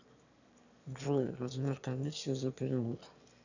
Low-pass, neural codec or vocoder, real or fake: 7.2 kHz; autoencoder, 22.05 kHz, a latent of 192 numbers a frame, VITS, trained on one speaker; fake